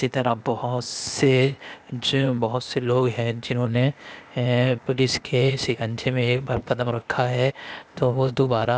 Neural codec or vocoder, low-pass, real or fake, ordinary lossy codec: codec, 16 kHz, 0.8 kbps, ZipCodec; none; fake; none